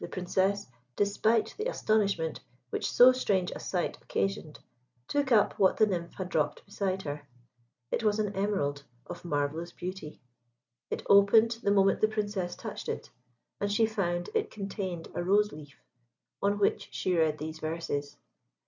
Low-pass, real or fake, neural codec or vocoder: 7.2 kHz; real; none